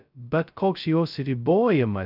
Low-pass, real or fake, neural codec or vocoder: 5.4 kHz; fake; codec, 16 kHz, 0.2 kbps, FocalCodec